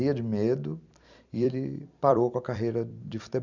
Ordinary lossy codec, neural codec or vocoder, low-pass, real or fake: none; vocoder, 44.1 kHz, 128 mel bands every 256 samples, BigVGAN v2; 7.2 kHz; fake